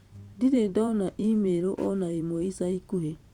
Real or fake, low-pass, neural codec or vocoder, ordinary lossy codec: fake; 19.8 kHz; vocoder, 44.1 kHz, 128 mel bands every 512 samples, BigVGAN v2; Opus, 64 kbps